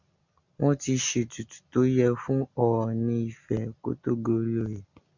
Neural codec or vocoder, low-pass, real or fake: none; 7.2 kHz; real